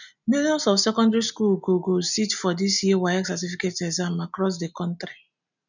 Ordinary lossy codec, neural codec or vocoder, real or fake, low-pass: none; none; real; 7.2 kHz